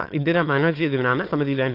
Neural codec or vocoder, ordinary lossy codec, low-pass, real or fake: autoencoder, 22.05 kHz, a latent of 192 numbers a frame, VITS, trained on many speakers; AAC, 32 kbps; 5.4 kHz; fake